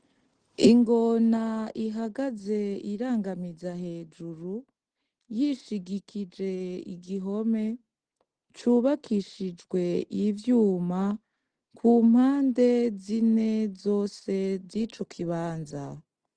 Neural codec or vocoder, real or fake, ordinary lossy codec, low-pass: none; real; Opus, 16 kbps; 9.9 kHz